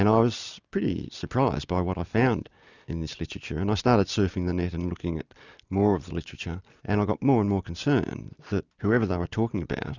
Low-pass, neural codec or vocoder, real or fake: 7.2 kHz; none; real